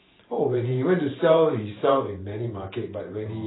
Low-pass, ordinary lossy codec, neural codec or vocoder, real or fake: 7.2 kHz; AAC, 16 kbps; vocoder, 44.1 kHz, 128 mel bands every 512 samples, BigVGAN v2; fake